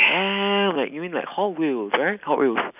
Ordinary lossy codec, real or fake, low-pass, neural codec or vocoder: none; real; 3.6 kHz; none